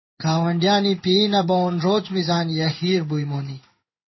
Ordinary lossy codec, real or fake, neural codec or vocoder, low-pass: MP3, 24 kbps; fake; codec, 16 kHz in and 24 kHz out, 1 kbps, XY-Tokenizer; 7.2 kHz